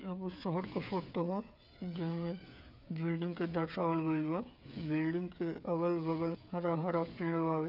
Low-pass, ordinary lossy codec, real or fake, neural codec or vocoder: 5.4 kHz; none; fake; codec, 16 kHz, 8 kbps, FreqCodec, smaller model